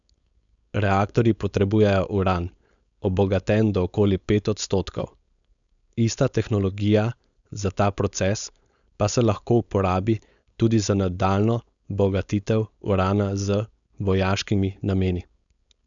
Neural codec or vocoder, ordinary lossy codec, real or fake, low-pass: codec, 16 kHz, 4.8 kbps, FACodec; none; fake; 7.2 kHz